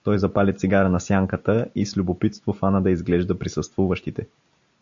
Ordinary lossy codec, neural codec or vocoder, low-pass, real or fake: AAC, 64 kbps; none; 7.2 kHz; real